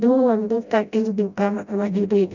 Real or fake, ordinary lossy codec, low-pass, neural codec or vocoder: fake; none; 7.2 kHz; codec, 16 kHz, 0.5 kbps, FreqCodec, smaller model